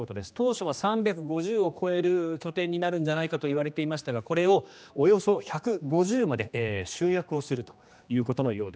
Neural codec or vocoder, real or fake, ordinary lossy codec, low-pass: codec, 16 kHz, 2 kbps, X-Codec, HuBERT features, trained on general audio; fake; none; none